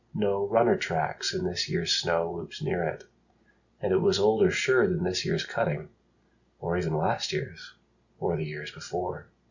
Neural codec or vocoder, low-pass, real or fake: none; 7.2 kHz; real